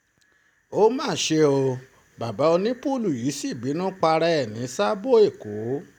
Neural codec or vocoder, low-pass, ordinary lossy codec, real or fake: none; 19.8 kHz; none; real